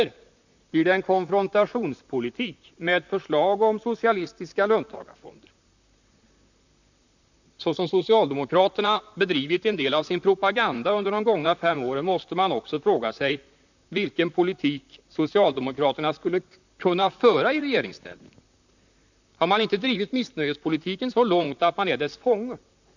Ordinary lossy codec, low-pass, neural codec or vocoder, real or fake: none; 7.2 kHz; vocoder, 44.1 kHz, 128 mel bands, Pupu-Vocoder; fake